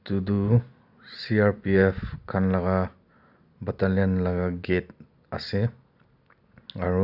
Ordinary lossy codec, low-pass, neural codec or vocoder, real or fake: none; 5.4 kHz; none; real